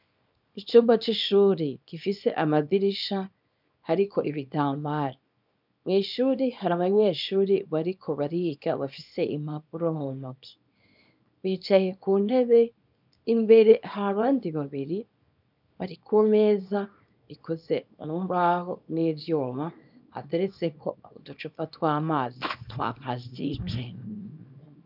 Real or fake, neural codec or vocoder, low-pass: fake; codec, 24 kHz, 0.9 kbps, WavTokenizer, small release; 5.4 kHz